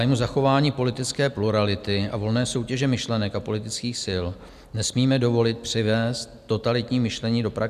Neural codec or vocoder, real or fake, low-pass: none; real; 14.4 kHz